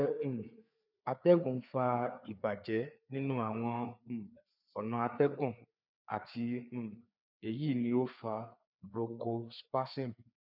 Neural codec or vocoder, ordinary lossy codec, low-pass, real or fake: codec, 16 kHz, 4 kbps, FreqCodec, larger model; none; 5.4 kHz; fake